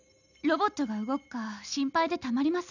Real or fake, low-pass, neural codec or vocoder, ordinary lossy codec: real; 7.2 kHz; none; none